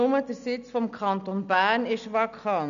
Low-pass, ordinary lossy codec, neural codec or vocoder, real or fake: 7.2 kHz; none; none; real